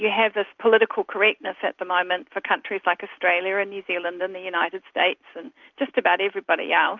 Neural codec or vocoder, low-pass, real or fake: none; 7.2 kHz; real